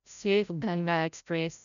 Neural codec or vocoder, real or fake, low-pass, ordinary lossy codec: codec, 16 kHz, 0.5 kbps, FreqCodec, larger model; fake; 7.2 kHz; none